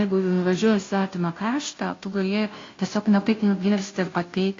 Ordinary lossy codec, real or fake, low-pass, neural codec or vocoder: AAC, 32 kbps; fake; 7.2 kHz; codec, 16 kHz, 0.5 kbps, FunCodec, trained on Chinese and English, 25 frames a second